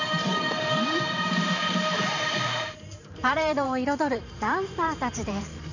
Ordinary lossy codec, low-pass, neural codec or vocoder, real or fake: none; 7.2 kHz; vocoder, 44.1 kHz, 128 mel bands every 512 samples, BigVGAN v2; fake